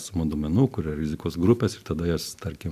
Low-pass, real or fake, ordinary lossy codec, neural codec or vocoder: 14.4 kHz; real; AAC, 96 kbps; none